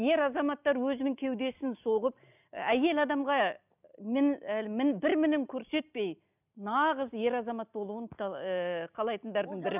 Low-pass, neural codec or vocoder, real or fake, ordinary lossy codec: 3.6 kHz; none; real; none